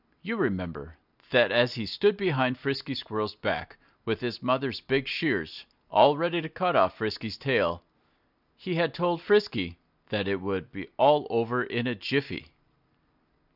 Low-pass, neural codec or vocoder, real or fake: 5.4 kHz; none; real